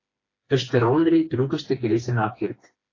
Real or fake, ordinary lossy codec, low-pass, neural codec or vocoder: fake; AAC, 32 kbps; 7.2 kHz; codec, 16 kHz, 2 kbps, FreqCodec, smaller model